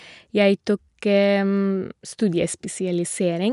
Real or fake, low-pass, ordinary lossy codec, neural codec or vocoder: real; 10.8 kHz; none; none